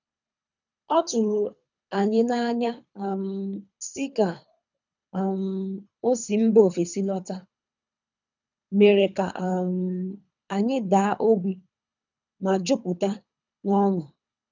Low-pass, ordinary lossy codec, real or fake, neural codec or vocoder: 7.2 kHz; none; fake; codec, 24 kHz, 3 kbps, HILCodec